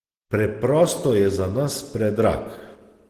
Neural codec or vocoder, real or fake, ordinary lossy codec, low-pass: none; real; Opus, 16 kbps; 14.4 kHz